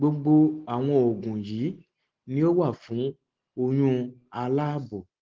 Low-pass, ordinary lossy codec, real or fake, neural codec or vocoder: 7.2 kHz; Opus, 16 kbps; real; none